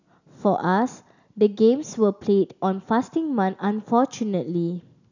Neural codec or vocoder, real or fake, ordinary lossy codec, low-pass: none; real; none; 7.2 kHz